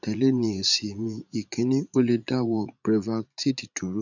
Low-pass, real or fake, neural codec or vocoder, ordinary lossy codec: 7.2 kHz; fake; vocoder, 44.1 kHz, 80 mel bands, Vocos; none